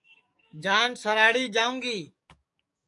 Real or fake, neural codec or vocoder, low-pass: fake; codec, 44.1 kHz, 7.8 kbps, DAC; 10.8 kHz